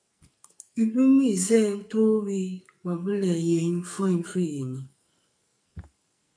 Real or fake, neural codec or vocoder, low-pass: fake; codec, 44.1 kHz, 2.6 kbps, SNAC; 9.9 kHz